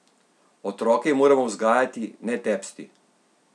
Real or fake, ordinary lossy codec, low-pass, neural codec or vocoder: real; none; none; none